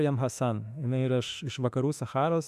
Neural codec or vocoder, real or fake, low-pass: autoencoder, 48 kHz, 32 numbers a frame, DAC-VAE, trained on Japanese speech; fake; 14.4 kHz